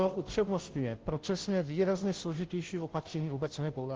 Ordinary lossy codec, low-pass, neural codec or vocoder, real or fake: Opus, 16 kbps; 7.2 kHz; codec, 16 kHz, 0.5 kbps, FunCodec, trained on Chinese and English, 25 frames a second; fake